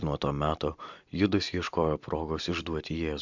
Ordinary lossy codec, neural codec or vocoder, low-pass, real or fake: MP3, 64 kbps; vocoder, 44.1 kHz, 128 mel bands every 256 samples, BigVGAN v2; 7.2 kHz; fake